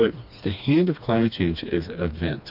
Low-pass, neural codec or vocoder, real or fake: 5.4 kHz; codec, 16 kHz, 2 kbps, FreqCodec, smaller model; fake